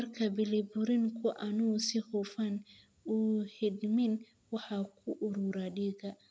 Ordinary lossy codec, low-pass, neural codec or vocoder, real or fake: none; none; none; real